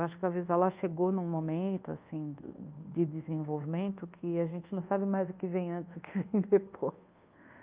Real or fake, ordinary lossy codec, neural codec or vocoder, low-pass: fake; Opus, 24 kbps; codec, 24 kHz, 1.2 kbps, DualCodec; 3.6 kHz